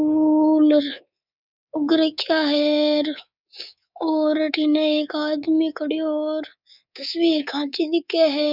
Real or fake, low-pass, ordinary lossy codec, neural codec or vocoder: fake; 5.4 kHz; none; codec, 24 kHz, 3.1 kbps, DualCodec